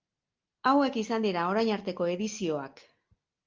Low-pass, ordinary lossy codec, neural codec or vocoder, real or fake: 7.2 kHz; Opus, 32 kbps; none; real